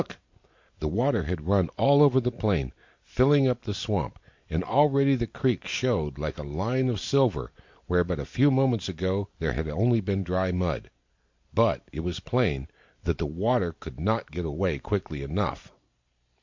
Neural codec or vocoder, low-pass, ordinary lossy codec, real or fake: vocoder, 44.1 kHz, 128 mel bands every 512 samples, BigVGAN v2; 7.2 kHz; MP3, 48 kbps; fake